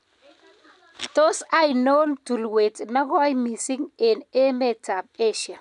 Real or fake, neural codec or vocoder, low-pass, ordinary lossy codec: real; none; 10.8 kHz; none